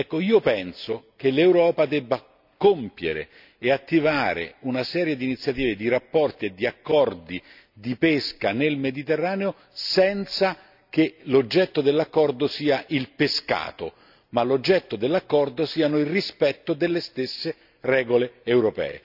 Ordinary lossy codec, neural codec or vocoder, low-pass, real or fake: none; none; 5.4 kHz; real